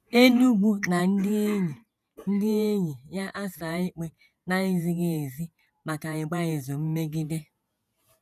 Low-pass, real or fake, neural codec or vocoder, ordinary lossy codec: 14.4 kHz; fake; vocoder, 48 kHz, 128 mel bands, Vocos; none